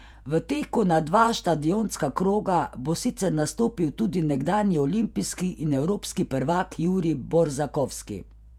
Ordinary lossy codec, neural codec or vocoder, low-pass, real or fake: none; vocoder, 48 kHz, 128 mel bands, Vocos; 19.8 kHz; fake